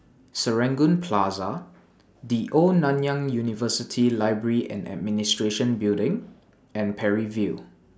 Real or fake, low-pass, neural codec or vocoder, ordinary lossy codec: real; none; none; none